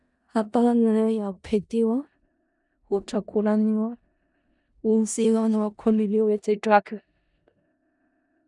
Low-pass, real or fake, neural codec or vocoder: 10.8 kHz; fake; codec, 16 kHz in and 24 kHz out, 0.4 kbps, LongCat-Audio-Codec, four codebook decoder